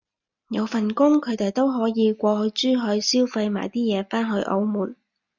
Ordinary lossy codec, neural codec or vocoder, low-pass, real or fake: MP3, 64 kbps; none; 7.2 kHz; real